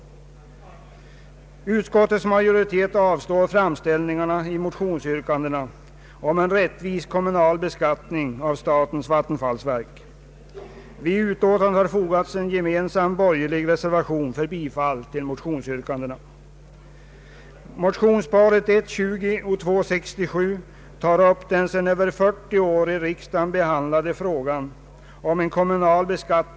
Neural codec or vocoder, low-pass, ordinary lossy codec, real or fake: none; none; none; real